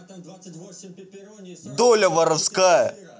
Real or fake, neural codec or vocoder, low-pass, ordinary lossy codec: real; none; none; none